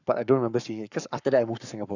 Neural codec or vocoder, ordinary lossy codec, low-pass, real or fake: codec, 44.1 kHz, 7.8 kbps, Pupu-Codec; none; 7.2 kHz; fake